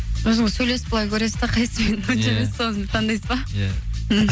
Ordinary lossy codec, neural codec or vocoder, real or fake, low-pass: none; none; real; none